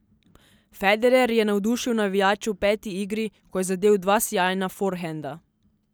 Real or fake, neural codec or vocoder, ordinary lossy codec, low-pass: real; none; none; none